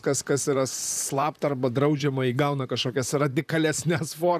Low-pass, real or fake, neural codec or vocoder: 14.4 kHz; fake; vocoder, 44.1 kHz, 128 mel bands, Pupu-Vocoder